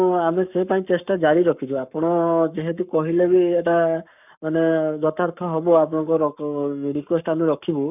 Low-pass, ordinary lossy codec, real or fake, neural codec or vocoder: 3.6 kHz; none; fake; codec, 44.1 kHz, 7.8 kbps, Pupu-Codec